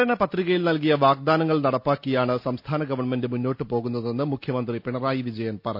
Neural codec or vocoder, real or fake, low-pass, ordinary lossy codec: none; real; 5.4 kHz; none